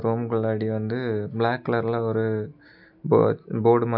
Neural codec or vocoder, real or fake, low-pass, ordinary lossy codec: none; real; 5.4 kHz; none